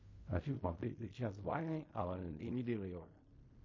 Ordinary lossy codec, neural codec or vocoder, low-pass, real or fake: MP3, 32 kbps; codec, 16 kHz in and 24 kHz out, 0.4 kbps, LongCat-Audio-Codec, fine tuned four codebook decoder; 7.2 kHz; fake